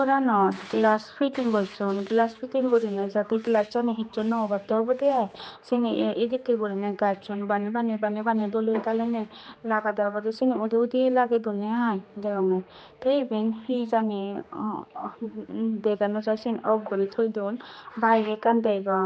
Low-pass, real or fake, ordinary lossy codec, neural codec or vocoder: none; fake; none; codec, 16 kHz, 2 kbps, X-Codec, HuBERT features, trained on general audio